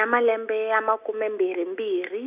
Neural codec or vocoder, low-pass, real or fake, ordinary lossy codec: none; 3.6 kHz; real; none